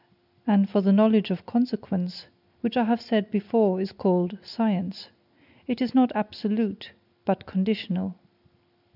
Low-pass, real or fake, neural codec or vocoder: 5.4 kHz; real; none